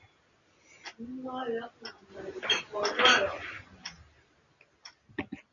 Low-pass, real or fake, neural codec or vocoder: 7.2 kHz; real; none